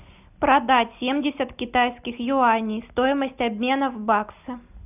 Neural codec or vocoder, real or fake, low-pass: none; real; 3.6 kHz